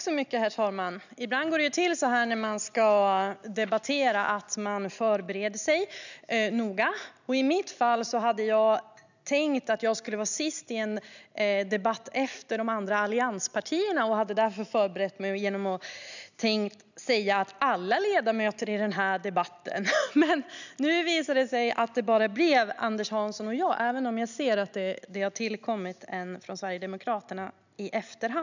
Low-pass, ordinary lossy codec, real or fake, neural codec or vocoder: 7.2 kHz; none; real; none